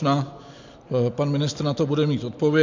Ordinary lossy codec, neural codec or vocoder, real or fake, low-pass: MP3, 64 kbps; none; real; 7.2 kHz